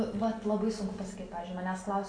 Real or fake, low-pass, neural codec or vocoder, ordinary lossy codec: real; 9.9 kHz; none; AAC, 48 kbps